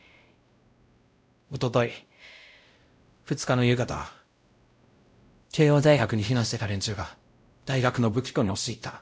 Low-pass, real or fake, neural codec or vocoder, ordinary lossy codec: none; fake; codec, 16 kHz, 0.5 kbps, X-Codec, WavLM features, trained on Multilingual LibriSpeech; none